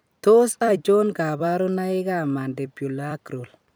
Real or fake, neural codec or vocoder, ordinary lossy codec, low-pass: fake; vocoder, 44.1 kHz, 128 mel bands every 256 samples, BigVGAN v2; none; none